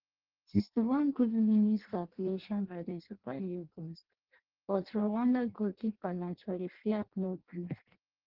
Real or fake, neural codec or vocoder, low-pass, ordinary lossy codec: fake; codec, 16 kHz in and 24 kHz out, 0.6 kbps, FireRedTTS-2 codec; 5.4 kHz; Opus, 16 kbps